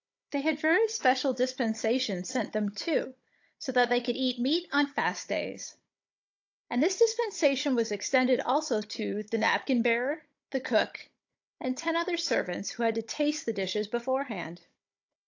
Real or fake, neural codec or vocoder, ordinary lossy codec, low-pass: fake; codec, 16 kHz, 16 kbps, FunCodec, trained on Chinese and English, 50 frames a second; AAC, 48 kbps; 7.2 kHz